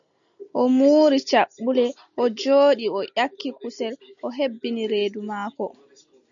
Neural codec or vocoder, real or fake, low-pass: none; real; 7.2 kHz